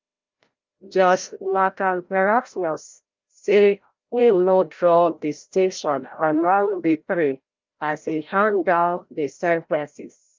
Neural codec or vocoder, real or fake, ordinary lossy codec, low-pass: codec, 16 kHz, 0.5 kbps, FreqCodec, larger model; fake; Opus, 24 kbps; 7.2 kHz